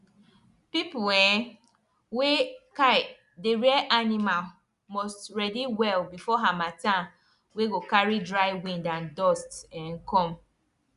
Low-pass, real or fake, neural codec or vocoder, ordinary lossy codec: 10.8 kHz; real; none; Opus, 64 kbps